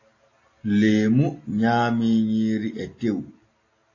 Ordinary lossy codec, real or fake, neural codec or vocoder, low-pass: AAC, 32 kbps; real; none; 7.2 kHz